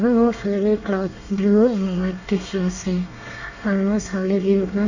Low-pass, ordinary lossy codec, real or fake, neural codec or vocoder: 7.2 kHz; none; fake; codec, 24 kHz, 1 kbps, SNAC